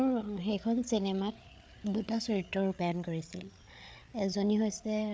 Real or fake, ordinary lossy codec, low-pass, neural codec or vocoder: fake; none; none; codec, 16 kHz, 8 kbps, FreqCodec, larger model